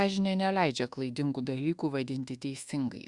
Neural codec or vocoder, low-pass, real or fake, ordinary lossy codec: codec, 24 kHz, 1.2 kbps, DualCodec; 10.8 kHz; fake; Opus, 64 kbps